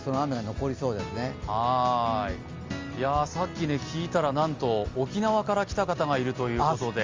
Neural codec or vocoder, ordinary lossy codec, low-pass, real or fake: none; Opus, 32 kbps; 7.2 kHz; real